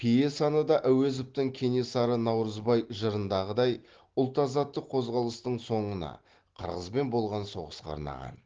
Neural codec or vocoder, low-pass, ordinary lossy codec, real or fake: none; 7.2 kHz; Opus, 16 kbps; real